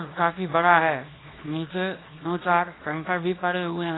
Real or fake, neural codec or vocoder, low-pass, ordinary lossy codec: fake; codec, 24 kHz, 0.9 kbps, WavTokenizer, small release; 7.2 kHz; AAC, 16 kbps